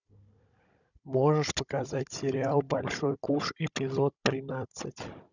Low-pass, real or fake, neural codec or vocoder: 7.2 kHz; fake; codec, 16 kHz, 16 kbps, FunCodec, trained on Chinese and English, 50 frames a second